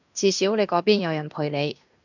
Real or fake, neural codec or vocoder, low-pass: fake; codec, 16 kHz in and 24 kHz out, 0.9 kbps, LongCat-Audio-Codec, fine tuned four codebook decoder; 7.2 kHz